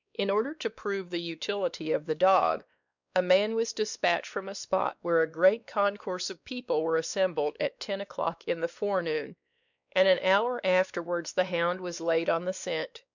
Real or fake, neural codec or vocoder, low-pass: fake; codec, 16 kHz, 2 kbps, X-Codec, WavLM features, trained on Multilingual LibriSpeech; 7.2 kHz